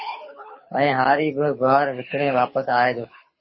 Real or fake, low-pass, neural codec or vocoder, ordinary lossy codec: fake; 7.2 kHz; codec, 24 kHz, 6 kbps, HILCodec; MP3, 24 kbps